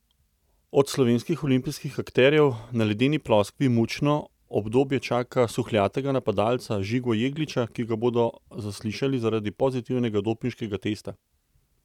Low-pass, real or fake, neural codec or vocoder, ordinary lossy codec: 19.8 kHz; real; none; none